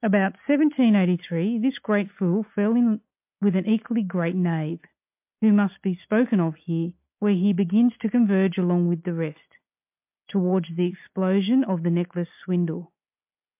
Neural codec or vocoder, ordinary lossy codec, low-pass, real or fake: none; MP3, 32 kbps; 3.6 kHz; real